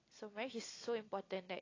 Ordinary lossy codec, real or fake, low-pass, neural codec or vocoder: AAC, 32 kbps; real; 7.2 kHz; none